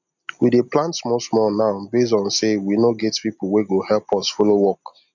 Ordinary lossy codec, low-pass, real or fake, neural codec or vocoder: none; 7.2 kHz; real; none